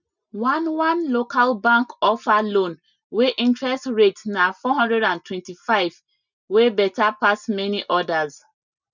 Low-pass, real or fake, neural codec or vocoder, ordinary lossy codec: 7.2 kHz; real; none; none